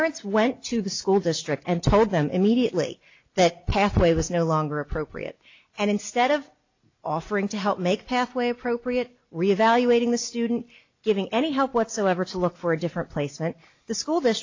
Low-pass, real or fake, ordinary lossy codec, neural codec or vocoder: 7.2 kHz; real; AAC, 48 kbps; none